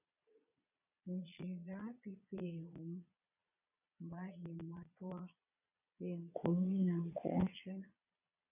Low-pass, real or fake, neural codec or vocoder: 3.6 kHz; fake; vocoder, 22.05 kHz, 80 mel bands, Vocos